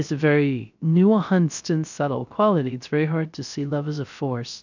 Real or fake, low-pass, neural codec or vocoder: fake; 7.2 kHz; codec, 16 kHz, 0.3 kbps, FocalCodec